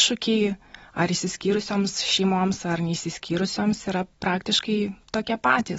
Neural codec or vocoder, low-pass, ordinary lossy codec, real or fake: none; 19.8 kHz; AAC, 24 kbps; real